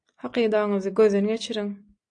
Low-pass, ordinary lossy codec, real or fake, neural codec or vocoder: 9.9 kHz; AAC, 64 kbps; real; none